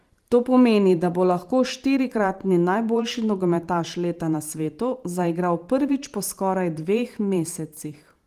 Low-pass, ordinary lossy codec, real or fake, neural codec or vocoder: 14.4 kHz; Opus, 24 kbps; fake; vocoder, 44.1 kHz, 128 mel bands every 512 samples, BigVGAN v2